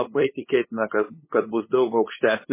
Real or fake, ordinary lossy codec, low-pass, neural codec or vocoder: fake; MP3, 16 kbps; 3.6 kHz; codec, 16 kHz, 8 kbps, FunCodec, trained on LibriTTS, 25 frames a second